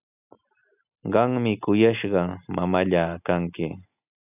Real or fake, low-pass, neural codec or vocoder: real; 3.6 kHz; none